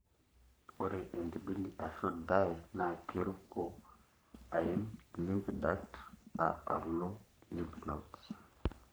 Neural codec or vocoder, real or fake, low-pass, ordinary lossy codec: codec, 44.1 kHz, 3.4 kbps, Pupu-Codec; fake; none; none